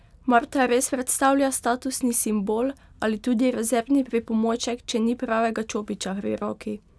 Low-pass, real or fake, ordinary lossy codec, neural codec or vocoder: none; real; none; none